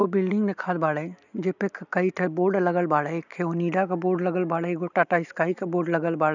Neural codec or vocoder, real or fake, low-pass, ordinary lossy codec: none; real; 7.2 kHz; none